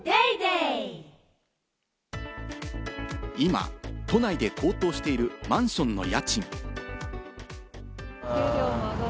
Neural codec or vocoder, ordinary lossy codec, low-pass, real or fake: none; none; none; real